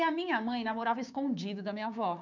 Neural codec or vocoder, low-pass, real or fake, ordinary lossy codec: codec, 16 kHz, 6 kbps, DAC; 7.2 kHz; fake; none